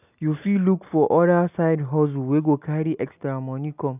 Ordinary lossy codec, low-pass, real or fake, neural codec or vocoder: none; 3.6 kHz; real; none